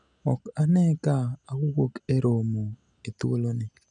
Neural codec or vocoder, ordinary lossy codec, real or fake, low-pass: none; none; real; 9.9 kHz